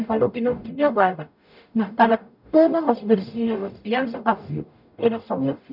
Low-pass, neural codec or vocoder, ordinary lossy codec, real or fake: 5.4 kHz; codec, 44.1 kHz, 0.9 kbps, DAC; none; fake